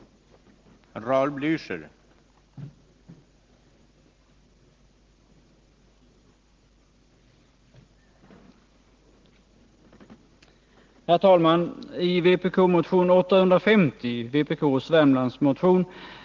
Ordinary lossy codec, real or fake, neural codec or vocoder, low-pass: Opus, 16 kbps; real; none; 7.2 kHz